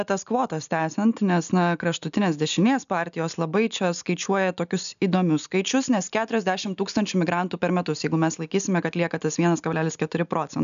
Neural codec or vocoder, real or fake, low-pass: none; real; 7.2 kHz